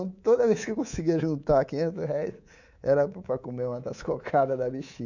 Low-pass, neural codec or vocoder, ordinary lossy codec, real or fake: 7.2 kHz; codec, 24 kHz, 3.1 kbps, DualCodec; none; fake